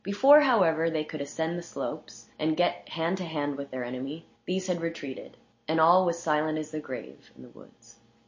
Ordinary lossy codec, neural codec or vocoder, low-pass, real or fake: MP3, 32 kbps; none; 7.2 kHz; real